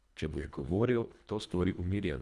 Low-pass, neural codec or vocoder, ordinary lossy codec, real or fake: none; codec, 24 kHz, 1.5 kbps, HILCodec; none; fake